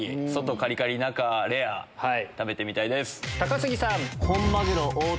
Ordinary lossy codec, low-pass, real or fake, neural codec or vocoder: none; none; real; none